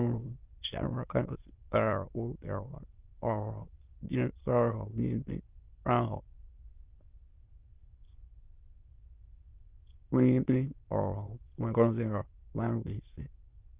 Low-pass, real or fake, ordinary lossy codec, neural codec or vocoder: 3.6 kHz; fake; Opus, 24 kbps; autoencoder, 22.05 kHz, a latent of 192 numbers a frame, VITS, trained on many speakers